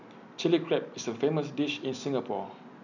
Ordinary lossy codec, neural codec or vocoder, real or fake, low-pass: none; none; real; 7.2 kHz